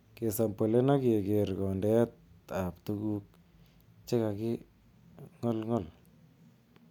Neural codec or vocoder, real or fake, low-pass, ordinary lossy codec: none; real; 19.8 kHz; none